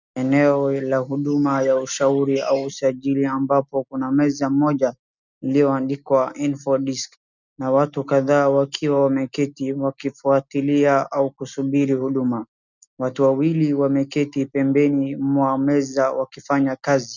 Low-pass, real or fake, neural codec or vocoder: 7.2 kHz; real; none